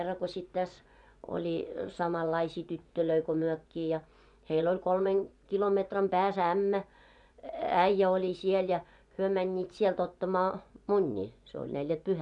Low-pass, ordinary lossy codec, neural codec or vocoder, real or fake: 10.8 kHz; none; vocoder, 24 kHz, 100 mel bands, Vocos; fake